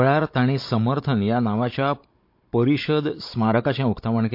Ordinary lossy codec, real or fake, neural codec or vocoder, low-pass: MP3, 32 kbps; fake; codec, 16 kHz, 16 kbps, FunCodec, trained on Chinese and English, 50 frames a second; 5.4 kHz